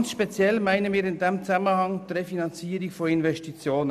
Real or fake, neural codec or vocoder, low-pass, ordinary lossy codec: real; none; 14.4 kHz; none